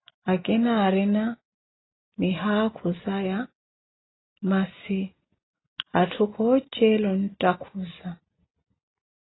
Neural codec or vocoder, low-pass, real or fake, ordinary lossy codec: none; 7.2 kHz; real; AAC, 16 kbps